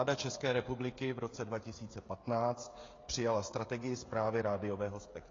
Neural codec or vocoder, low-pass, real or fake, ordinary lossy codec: codec, 16 kHz, 16 kbps, FreqCodec, smaller model; 7.2 kHz; fake; AAC, 32 kbps